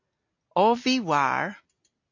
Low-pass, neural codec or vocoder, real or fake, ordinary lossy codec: 7.2 kHz; none; real; AAC, 48 kbps